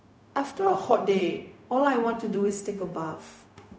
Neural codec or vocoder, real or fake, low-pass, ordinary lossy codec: codec, 16 kHz, 0.4 kbps, LongCat-Audio-Codec; fake; none; none